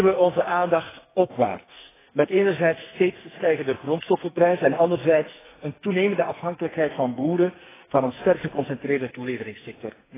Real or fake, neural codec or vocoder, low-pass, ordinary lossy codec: fake; codec, 44.1 kHz, 2.6 kbps, SNAC; 3.6 kHz; AAC, 16 kbps